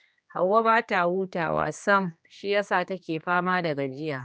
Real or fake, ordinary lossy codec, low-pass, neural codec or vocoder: fake; none; none; codec, 16 kHz, 2 kbps, X-Codec, HuBERT features, trained on general audio